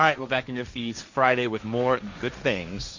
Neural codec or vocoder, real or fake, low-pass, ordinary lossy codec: codec, 16 kHz, 1.1 kbps, Voila-Tokenizer; fake; 7.2 kHz; Opus, 64 kbps